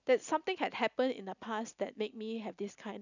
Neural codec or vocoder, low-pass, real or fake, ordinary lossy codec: none; 7.2 kHz; real; none